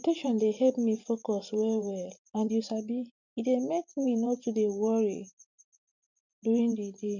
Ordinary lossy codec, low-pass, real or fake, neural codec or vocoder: none; 7.2 kHz; fake; vocoder, 44.1 kHz, 128 mel bands every 512 samples, BigVGAN v2